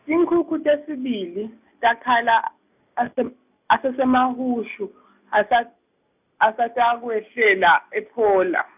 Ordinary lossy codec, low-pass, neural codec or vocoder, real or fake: none; 3.6 kHz; none; real